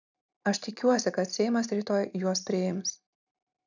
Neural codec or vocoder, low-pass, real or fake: none; 7.2 kHz; real